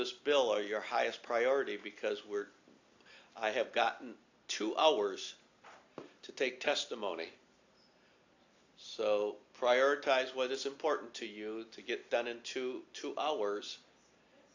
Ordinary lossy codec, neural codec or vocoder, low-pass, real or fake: AAC, 48 kbps; none; 7.2 kHz; real